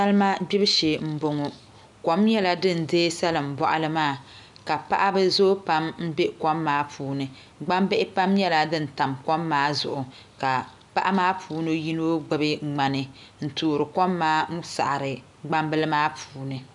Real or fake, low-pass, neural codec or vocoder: real; 10.8 kHz; none